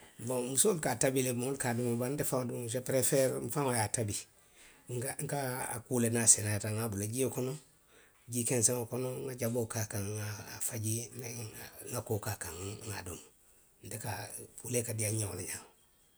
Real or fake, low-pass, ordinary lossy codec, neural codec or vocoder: real; none; none; none